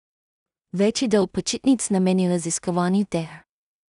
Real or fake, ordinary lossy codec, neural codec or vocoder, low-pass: fake; none; codec, 16 kHz in and 24 kHz out, 0.4 kbps, LongCat-Audio-Codec, two codebook decoder; 10.8 kHz